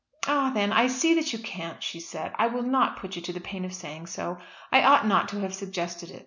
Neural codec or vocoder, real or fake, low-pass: none; real; 7.2 kHz